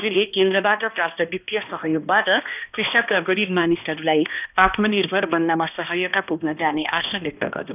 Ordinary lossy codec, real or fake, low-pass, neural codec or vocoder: none; fake; 3.6 kHz; codec, 16 kHz, 1 kbps, X-Codec, HuBERT features, trained on balanced general audio